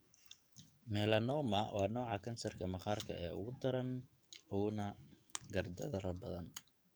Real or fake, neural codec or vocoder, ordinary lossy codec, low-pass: fake; codec, 44.1 kHz, 7.8 kbps, DAC; none; none